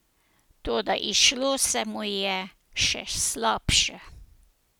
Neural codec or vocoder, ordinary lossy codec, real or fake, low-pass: none; none; real; none